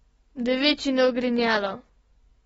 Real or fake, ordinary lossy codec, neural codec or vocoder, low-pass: real; AAC, 24 kbps; none; 19.8 kHz